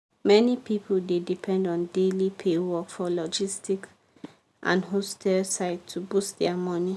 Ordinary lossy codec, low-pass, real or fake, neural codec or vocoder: none; none; real; none